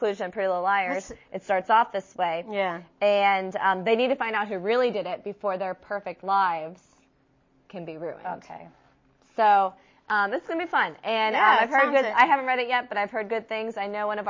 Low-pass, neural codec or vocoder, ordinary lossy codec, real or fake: 7.2 kHz; none; MP3, 32 kbps; real